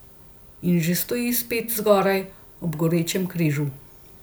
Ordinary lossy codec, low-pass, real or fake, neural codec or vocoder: none; none; real; none